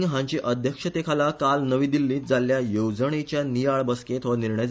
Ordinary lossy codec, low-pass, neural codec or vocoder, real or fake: none; none; none; real